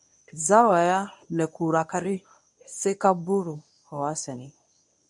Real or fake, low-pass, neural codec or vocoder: fake; 10.8 kHz; codec, 24 kHz, 0.9 kbps, WavTokenizer, medium speech release version 1